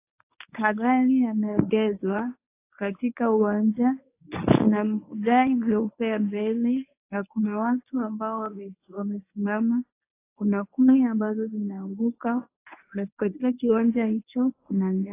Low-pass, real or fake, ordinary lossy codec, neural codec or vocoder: 3.6 kHz; fake; AAC, 24 kbps; codec, 24 kHz, 0.9 kbps, WavTokenizer, medium speech release version 1